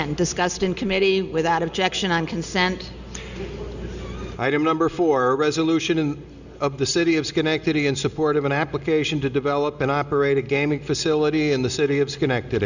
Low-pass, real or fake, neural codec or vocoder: 7.2 kHz; real; none